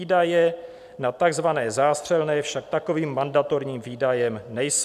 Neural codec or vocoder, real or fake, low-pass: none; real; 14.4 kHz